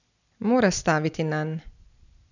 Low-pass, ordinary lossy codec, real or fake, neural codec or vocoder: 7.2 kHz; none; real; none